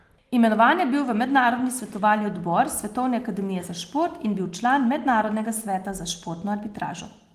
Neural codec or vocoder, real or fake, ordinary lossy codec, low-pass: none; real; Opus, 24 kbps; 14.4 kHz